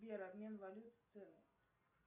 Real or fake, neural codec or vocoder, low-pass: real; none; 3.6 kHz